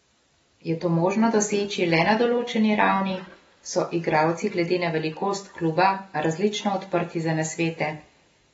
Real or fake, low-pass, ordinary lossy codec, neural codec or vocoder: real; 19.8 kHz; AAC, 24 kbps; none